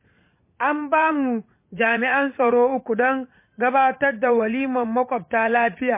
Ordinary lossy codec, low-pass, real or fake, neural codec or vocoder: MP3, 24 kbps; 3.6 kHz; fake; vocoder, 44.1 kHz, 80 mel bands, Vocos